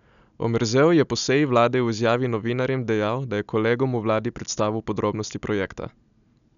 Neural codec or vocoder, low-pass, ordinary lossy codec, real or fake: none; 7.2 kHz; none; real